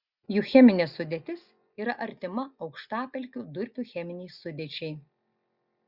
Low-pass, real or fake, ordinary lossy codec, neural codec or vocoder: 5.4 kHz; real; Opus, 64 kbps; none